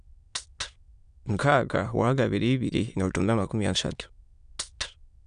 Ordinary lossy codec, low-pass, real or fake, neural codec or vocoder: none; 9.9 kHz; fake; autoencoder, 22.05 kHz, a latent of 192 numbers a frame, VITS, trained on many speakers